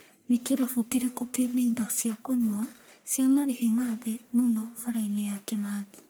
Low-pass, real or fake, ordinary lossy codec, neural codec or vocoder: none; fake; none; codec, 44.1 kHz, 1.7 kbps, Pupu-Codec